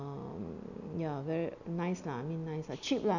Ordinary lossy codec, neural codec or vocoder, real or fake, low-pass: none; none; real; 7.2 kHz